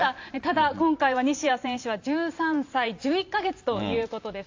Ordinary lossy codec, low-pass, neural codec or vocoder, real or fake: AAC, 48 kbps; 7.2 kHz; vocoder, 44.1 kHz, 128 mel bands every 512 samples, BigVGAN v2; fake